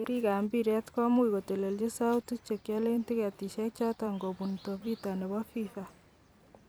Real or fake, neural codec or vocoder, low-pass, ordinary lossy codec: fake; vocoder, 44.1 kHz, 128 mel bands every 256 samples, BigVGAN v2; none; none